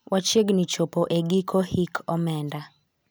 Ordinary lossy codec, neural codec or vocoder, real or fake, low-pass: none; none; real; none